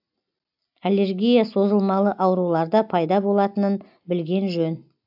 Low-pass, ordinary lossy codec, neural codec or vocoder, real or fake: 5.4 kHz; AAC, 48 kbps; none; real